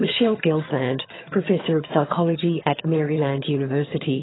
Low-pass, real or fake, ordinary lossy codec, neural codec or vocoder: 7.2 kHz; fake; AAC, 16 kbps; vocoder, 22.05 kHz, 80 mel bands, HiFi-GAN